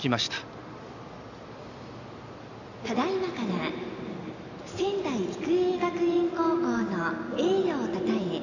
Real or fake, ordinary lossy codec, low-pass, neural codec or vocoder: real; none; 7.2 kHz; none